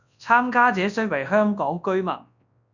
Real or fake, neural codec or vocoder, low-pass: fake; codec, 24 kHz, 0.9 kbps, WavTokenizer, large speech release; 7.2 kHz